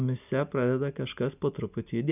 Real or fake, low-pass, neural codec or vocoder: real; 3.6 kHz; none